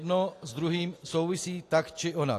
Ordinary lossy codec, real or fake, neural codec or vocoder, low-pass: AAC, 48 kbps; real; none; 14.4 kHz